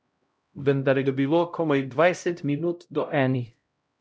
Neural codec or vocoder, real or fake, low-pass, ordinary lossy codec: codec, 16 kHz, 0.5 kbps, X-Codec, HuBERT features, trained on LibriSpeech; fake; none; none